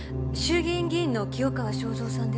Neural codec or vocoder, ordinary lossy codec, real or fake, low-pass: none; none; real; none